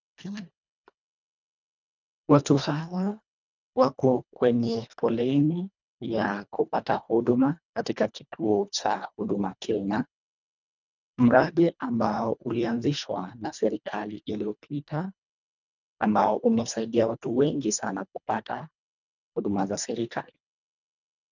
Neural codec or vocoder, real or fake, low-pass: codec, 24 kHz, 1.5 kbps, HILCodec; fake; 7.2 kHz